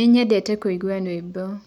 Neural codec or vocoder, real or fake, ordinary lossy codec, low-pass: none; real; none; 19.8 kHz